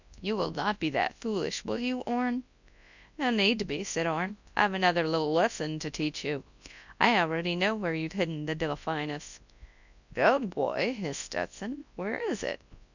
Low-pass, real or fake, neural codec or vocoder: 7.2 kHz; fake; codec, 24 kHz, 0.9 kbps, WavTokenizer, large speech release